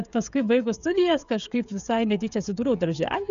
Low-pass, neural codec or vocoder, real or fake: 7.2 kHz; codec, 16 kHz, 16 kbps, FreqCodec, smaller model; fake